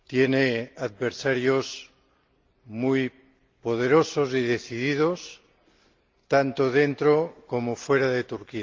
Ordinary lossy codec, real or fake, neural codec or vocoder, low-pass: Opus, 32 kbps; real; none; 7.2 kHz